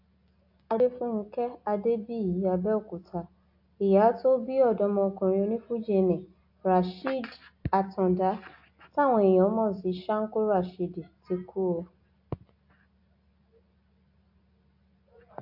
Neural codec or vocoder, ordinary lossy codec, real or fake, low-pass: none; AAC, 48 kbps; real; 5.4 kHz